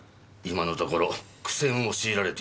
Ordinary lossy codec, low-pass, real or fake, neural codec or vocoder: none; none; real; none